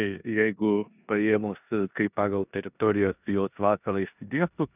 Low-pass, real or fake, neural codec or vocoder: 3.6 kHz; fake; codec, 16 kHz in and 24 kHz out, 0.9 kbps, LongCat-Audio-Codec, four codebook decoder